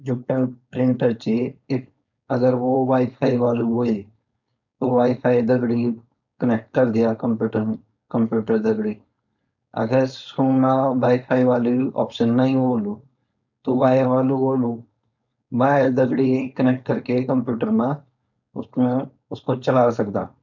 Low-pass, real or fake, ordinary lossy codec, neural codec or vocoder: 7.2 kHz; fake; none; codec, 16 kHz, 4.8 kbps, FACodec